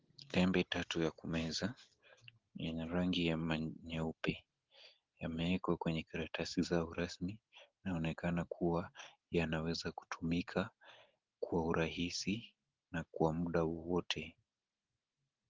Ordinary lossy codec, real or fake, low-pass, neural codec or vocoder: Opus, 16 kbps; real; 7.2 kHz; none